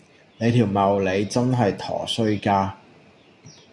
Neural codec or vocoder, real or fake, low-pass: none; real; 10.8 kHz